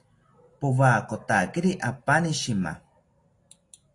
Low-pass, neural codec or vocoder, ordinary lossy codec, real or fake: 10.8 kHz; none; AAC, 48 kbps; real